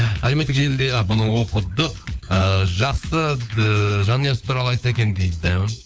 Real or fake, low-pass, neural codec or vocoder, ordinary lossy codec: fake; none; codec, 16 kHz, 4 kbps, FunCodec, trained on LibriTTS, 50 frames a second; none